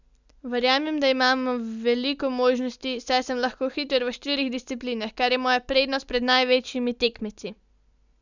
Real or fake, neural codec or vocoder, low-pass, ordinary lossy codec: real; none; 7.2 kHz; none